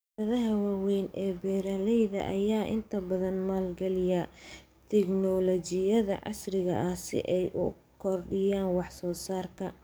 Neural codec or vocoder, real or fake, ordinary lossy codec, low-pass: codec, 44.1 kHz, 7.8 kbps, DAC; fake; none; none